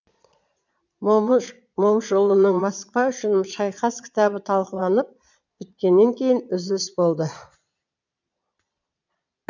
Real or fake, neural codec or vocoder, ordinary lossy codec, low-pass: fake; vocoder, 22.05 kHz, 80 mel bands, Vocos; none; 7.2 kHz